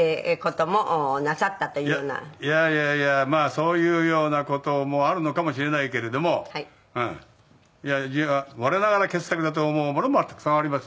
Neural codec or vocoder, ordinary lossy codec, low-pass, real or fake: none; none; none; real